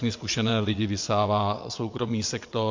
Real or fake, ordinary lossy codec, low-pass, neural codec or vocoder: fake; MP3, 48 kbps; 7.2 kHz; vocoder, 22.05 kHz, 80 mel bands, WaveNeXt